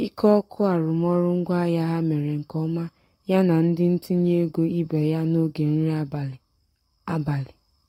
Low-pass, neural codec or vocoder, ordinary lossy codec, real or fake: 19.8 kHz; codec, 44.1 kHz, 7.8 kbps, Pupu-Codec; AAC, 48 kbps; fake